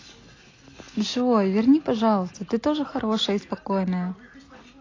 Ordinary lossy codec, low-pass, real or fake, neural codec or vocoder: AAC, 32 kbps; 7.2 kHz; real; none